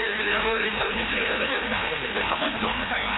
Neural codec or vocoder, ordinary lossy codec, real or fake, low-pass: codec, 16 kHz, 1 kbps, FreqCodec, larger model; AAC, 16 kbps; fake; 7.2 kHz